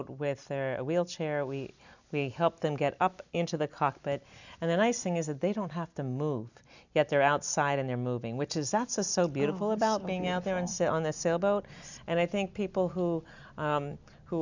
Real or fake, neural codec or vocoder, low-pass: real; none; 7.2 kHz